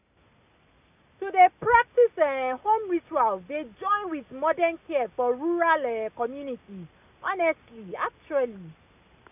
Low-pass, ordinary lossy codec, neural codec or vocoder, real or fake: 3.6 kHz; none; none; real